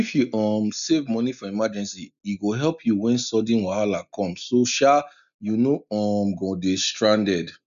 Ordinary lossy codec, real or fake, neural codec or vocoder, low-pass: none; real; none; 7.2 kHz